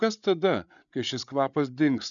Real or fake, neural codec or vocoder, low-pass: fake; codec, 16 kHz, 8 kbps, FreqCodec, larger model; 7.2 kHz